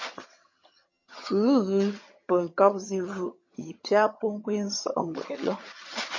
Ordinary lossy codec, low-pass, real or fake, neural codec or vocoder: MP3, 32 kbps; 7.2 kHz; fake; vocoder, 22.05 kHz, 80 mel bands, HiFi-GAN